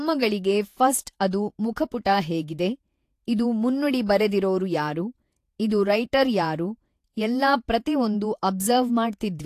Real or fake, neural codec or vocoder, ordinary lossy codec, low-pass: fake; vocoder, 48 kHz, 128 mel bands, Vocos; AAC, 64 kbps; 14.4 kHz